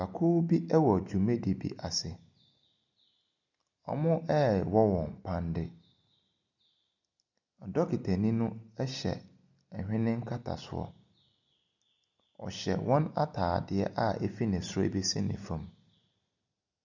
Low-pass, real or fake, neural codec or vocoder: 7.2 kHz; real; none